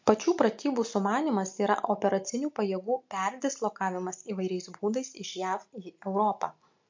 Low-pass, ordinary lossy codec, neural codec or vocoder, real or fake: 7.2 kHz; MP3, 48 kbps; none; real